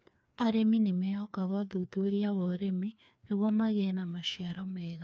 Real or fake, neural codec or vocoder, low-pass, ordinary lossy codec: fake; codec, 16 kHz, 2 kbps, FreqCodec, larger model; none; none